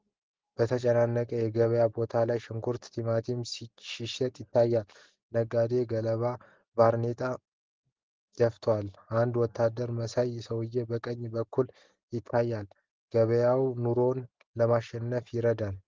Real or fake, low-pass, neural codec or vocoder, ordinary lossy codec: real; 7.2 kHz; none; Opus, 16 kbps